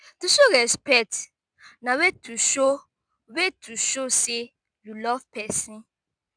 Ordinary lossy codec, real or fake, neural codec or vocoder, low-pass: none; real; none; 9.9 kHz